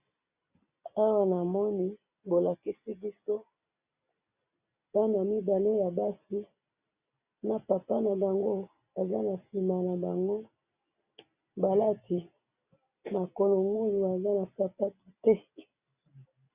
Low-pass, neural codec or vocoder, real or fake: 3.6 kHz; none; real